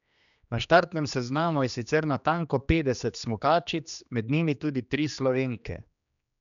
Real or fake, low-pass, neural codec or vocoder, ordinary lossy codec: fake; 7.2 kHz; codec, 16 kHz, 2 kbps, X-Codec, HuBERT features, trained on general audio; none